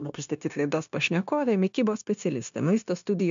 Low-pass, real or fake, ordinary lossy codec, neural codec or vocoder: 7.2 kHz; fake; MP3, 64 kbps; codec, 16 kHz, 0.9 kbps, LongCat-Audio-Codec